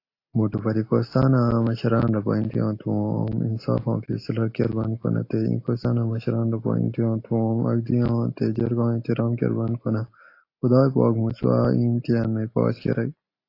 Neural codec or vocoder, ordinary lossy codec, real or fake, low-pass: none; AAC, 32 kbps; real; 5.4 kHz